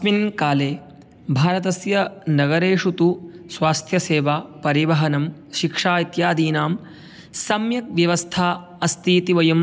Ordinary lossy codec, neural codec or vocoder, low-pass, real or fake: none; none; none; real